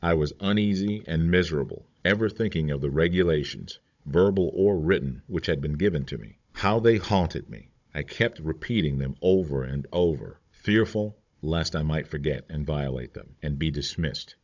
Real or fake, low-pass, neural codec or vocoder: fake; 7.2 kHz; codec, 16 kHz, 16 kbps, FunCodec, trained on Chinese and English, 50 frames a second